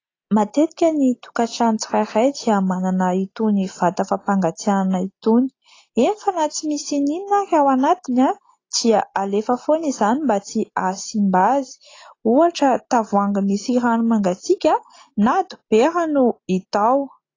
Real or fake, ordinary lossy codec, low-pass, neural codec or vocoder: real; AAC, 32 kbps; 7.2 kHz; none